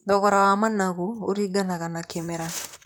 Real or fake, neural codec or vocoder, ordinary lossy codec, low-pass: fake; vocoder, 44.1 kHz, 128 mel bands, Pupu-Vocoder; none; none